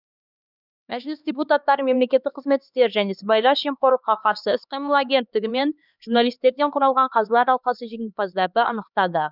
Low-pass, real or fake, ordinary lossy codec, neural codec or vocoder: 5.4 kHz; fake; none; codec, 16 kHz, 2 kbps, X-Codec, HuBERT features, trained on LibriSpeech